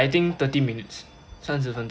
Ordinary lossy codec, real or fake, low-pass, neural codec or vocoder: none; real; none; none